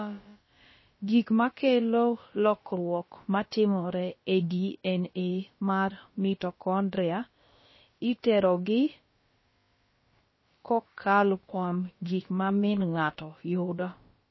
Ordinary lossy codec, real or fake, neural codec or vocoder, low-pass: MP3, 24 kbps; fake; codec, 16 kHz, about 1 kbps, DyCAST, with the encoder's durations; 7.2 kHz